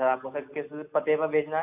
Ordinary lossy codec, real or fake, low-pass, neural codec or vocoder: AAC, 32 kbps; real; 3.6 kHz; none